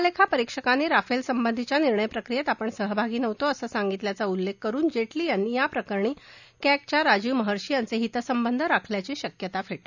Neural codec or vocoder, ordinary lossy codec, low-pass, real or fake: none; none; 7.2 kHz; real